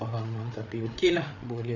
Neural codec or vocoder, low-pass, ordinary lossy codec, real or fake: codec, 16 kHz, 8 kbps, FreqCodec, larger model; 7.2 kHz; none; fake